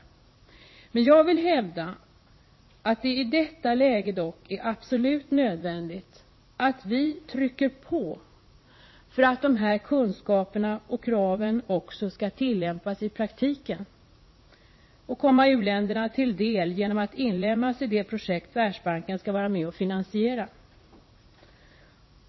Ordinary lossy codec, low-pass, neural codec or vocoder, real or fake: MP3, 24 kbps; 7.2 kHz; vocoder, 44.1 kHz, 80 mel bands, Vocos; fake